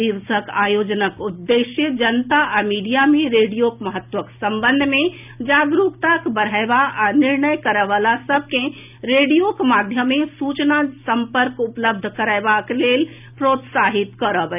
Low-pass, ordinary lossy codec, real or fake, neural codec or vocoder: 3.6 kHz; none; real; none